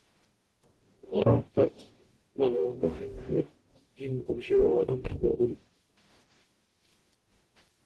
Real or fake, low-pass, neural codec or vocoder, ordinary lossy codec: fake; 19.8 kHz; codec, 44.1 kHz, 0.9 kbps, DAC; Opus, 16 kbps